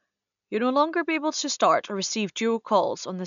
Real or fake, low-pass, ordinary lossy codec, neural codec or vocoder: real; 7.2 kHz; none; none